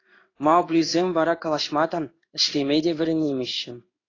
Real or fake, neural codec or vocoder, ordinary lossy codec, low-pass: fake; codec, 16 kHz in and 24 kHz out, 1 kbps, XY-Tokenizer; AAC, 32 kbps; 7.2 kHz